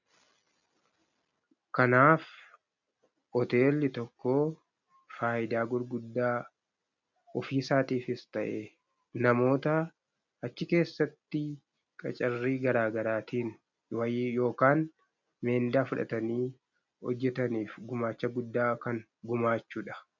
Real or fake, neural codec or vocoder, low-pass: real; none; 7.2 kHz